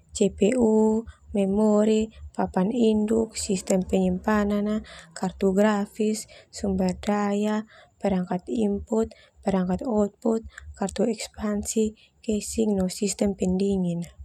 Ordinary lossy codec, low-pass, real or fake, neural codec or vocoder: none; 19.8 kHz; real; none